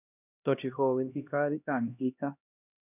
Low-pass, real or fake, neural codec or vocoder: 3.6 kHz; fake; codec, 16 kHz, 1 kbps, X-Codec, HuBERT features, trained on LibriSpeech